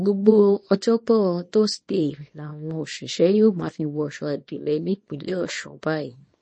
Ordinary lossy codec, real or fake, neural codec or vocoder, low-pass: MP3, 32 kbps; fake; codec, 24 kHz, 0.9 kbps, WavTokenizer, small release; 10.8 kHz